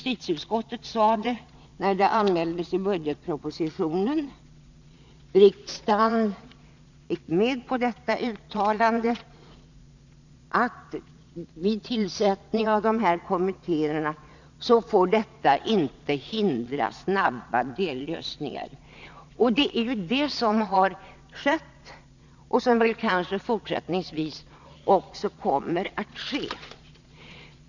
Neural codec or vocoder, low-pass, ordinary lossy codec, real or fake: vocoder, 22.05 kHz, 80 mel bands, Vocos; 7.2 kHz; none; fake